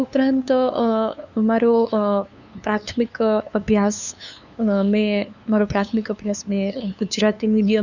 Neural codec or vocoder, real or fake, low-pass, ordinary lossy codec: codec, 16 kHz, 2 kbps, FunCodec, trained on LibriTTS, 25 frames a second; fake; 7.2 kHz; none